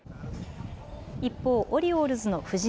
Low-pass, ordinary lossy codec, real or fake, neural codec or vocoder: none; none; real; none